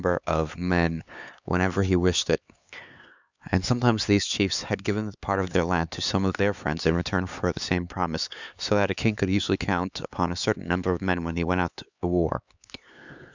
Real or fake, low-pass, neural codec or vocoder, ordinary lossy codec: fake; 7.2 kHz; codec, 16 kHz, 4 kbps, X-Codec, HuBERT features, trained on LibriSpeech; Opus, 64 kbps